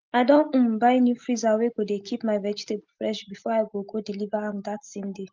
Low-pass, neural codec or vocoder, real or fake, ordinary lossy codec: 7.2 kHz; none; real; Opus, 32 kbps